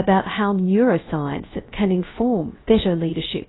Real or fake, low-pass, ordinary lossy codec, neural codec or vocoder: fake; 7.2 kHz; AAC, 16 kbps; codec, 16 kHz, 0.3 kbps, FocalCodec